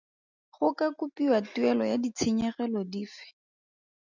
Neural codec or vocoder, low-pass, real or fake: none; 7.2 kHz; real